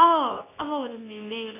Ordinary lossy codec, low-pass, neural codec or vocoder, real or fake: none; 3.6 kHz; codec, 24 kHz, 0.9 kbps, WavTokenizer, medium speech release version 2; fake